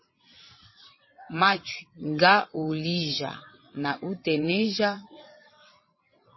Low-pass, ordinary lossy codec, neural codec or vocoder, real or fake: 7.2 kHz; MP3, 24 kbps; vocoder, 22.05 kHz, 80 mel bands, WaveNeXt; fake